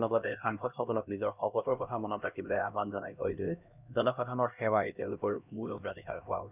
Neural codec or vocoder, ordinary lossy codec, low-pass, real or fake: codec, 16 kHz, 1 kbps, X-Codec, HuBERT features, trained on LibriSpeech; none; 3.6 kHz; fake